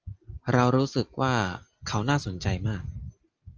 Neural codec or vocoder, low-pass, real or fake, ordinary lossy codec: none; 7.2 kHz; real; Opus, 24 kbps